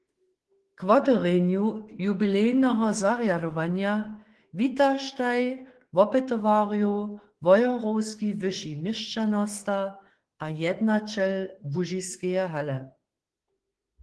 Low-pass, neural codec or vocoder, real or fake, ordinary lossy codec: 10.8 kHz; autoencoder, 48 kHz, 32 numbers a frame, DAC-VAE, trained on Japanese speech; fake; Opus, 16 kbps